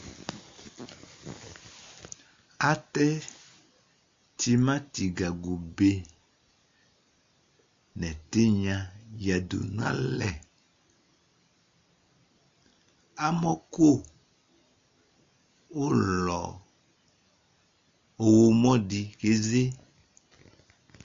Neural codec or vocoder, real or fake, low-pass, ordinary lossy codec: none; real; 7.2 kHz; MP3, 48 kbps